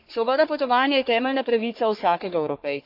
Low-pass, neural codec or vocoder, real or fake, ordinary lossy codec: 5.4 kHz; codec, 44.1 kHz, 3.4 kbps, Pupu-Codec; fake; none